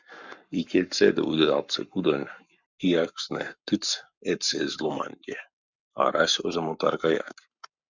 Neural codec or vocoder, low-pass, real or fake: codec, 44.1 kHz, 7.8 kbps, Pupu-Codec; 7.2 kHz; fake